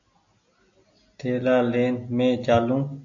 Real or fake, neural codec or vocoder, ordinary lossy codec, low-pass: real; none; AAC, 48 kbps; 7.2 kHz